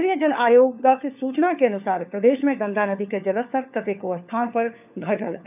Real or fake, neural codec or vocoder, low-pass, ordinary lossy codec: fake; codec, 16 kHz, 4 kbps, FunCodec, trained on LibriTTS, 50 frames a second; 3.6 kHz; none